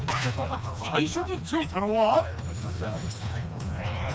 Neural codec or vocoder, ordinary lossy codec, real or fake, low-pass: codec, 16 kHz, 2 kbps, FreqCodec, smaller model; none; fake; none